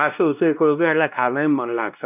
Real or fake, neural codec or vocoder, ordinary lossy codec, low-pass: fake; codec, 16 kHz, 1 kbps, X-Codec, WavLM features, trained on Multilingual LibriSpeech; none; 3.6 kHz